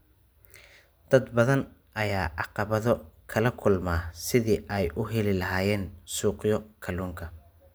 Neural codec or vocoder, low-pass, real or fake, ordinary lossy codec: none; none; real; none